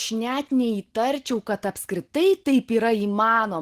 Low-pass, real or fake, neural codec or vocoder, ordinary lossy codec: 14.4 kHz; real; none; Opus, 16 kbps